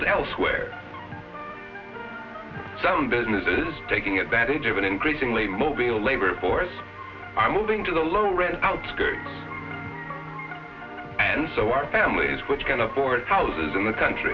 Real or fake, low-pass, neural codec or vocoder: real; 7.2 kHz; none